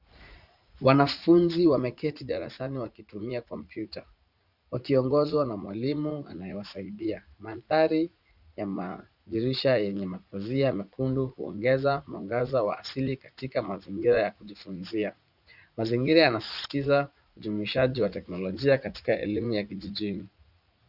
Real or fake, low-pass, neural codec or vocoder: fake; 5.4 kHz; vocoder, 44.1 kHz, 80 mel bands, Vocos